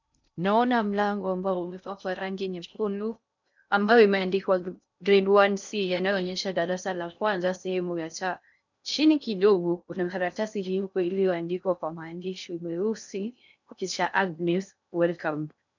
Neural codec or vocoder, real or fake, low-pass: codec, 16 kHz in and 24 kHz out, 0.6 kbps, FocalCodec, streaming, 2048 codes; fake; 7.2 kHz